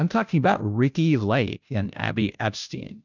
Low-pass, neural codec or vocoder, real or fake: 7.2 kHz; codec, 16 kHz, 0.5 kbps, FunCodec, trained on Chinese and English, 25 frames a second; fake